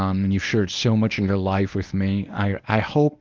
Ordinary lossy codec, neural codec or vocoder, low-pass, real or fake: Opus, 32 kbps; codec, 24 kHz, 0.9 kbps, WavTokenizer, medium speech release version 1; 7.2 kHz; fake